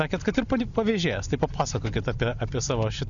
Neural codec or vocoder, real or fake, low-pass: none; real; 7.2 kHz